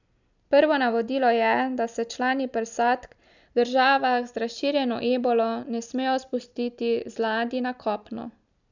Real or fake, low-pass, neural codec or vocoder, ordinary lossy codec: real; 7.2 kHz; none; none